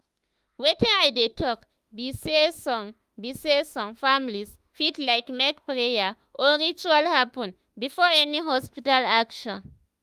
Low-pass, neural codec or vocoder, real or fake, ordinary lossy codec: 14.4 kHz; autoencoder, 48 kHz, 32 numbers a frame, DAC-VAE, trained on Japanese speech; fake; Opus, 32 kbps